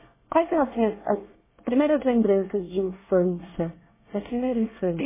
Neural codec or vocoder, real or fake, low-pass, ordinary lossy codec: codec, 24 kHz, 1 kbps, SNAC; fake; 3.6 kHz; MP3, 16 kbps